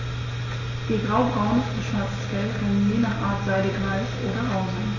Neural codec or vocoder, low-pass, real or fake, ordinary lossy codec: none; 7.2 kHz; real; MP3, 32 kbps